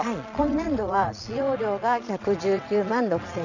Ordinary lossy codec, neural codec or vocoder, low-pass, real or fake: none; vocoder, 22.05 kHz, 80 mel bands, Vocos; 7.2 kHz; fake